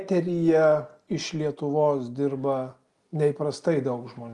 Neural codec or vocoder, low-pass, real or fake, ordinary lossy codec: none; 10.8 kHz; real; Opus, 32 kbps